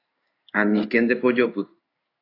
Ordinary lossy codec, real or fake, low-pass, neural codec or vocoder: AAC, 32 kbps; fake; 5.4 kHz; codec, 16 kHz in and 24 kHz out, 1 kbps, XY-Tokenizer